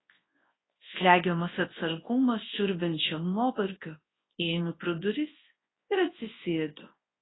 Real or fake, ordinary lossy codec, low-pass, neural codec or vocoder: fake; AAC, 16 kbps; 7.2 kHz; codec, 24 kHz, 0.9 kbps, WavTokenizer, large speech release